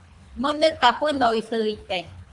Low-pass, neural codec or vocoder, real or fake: 10.8 kHz; codec, 24 kHz, 3 kbps, HILCodec; fake